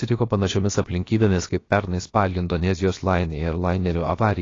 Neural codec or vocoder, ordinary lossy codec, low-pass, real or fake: codec, 16 kHz, about 1 kbps, DyCAST, with the encoder's durations; AAC, 32 kbps; 7.2 kHz; fake